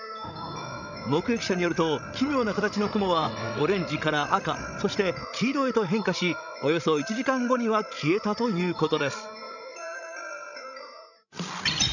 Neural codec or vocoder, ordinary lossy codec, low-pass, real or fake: codec, 16 kHz, 16 kbps, FreqCodec, larger model; none; 7.2 kHz; fake